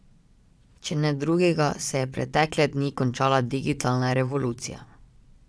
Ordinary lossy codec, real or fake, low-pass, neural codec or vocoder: none; fake; none; vocoder, 22.05 kHz, 80 mel bands, Vocos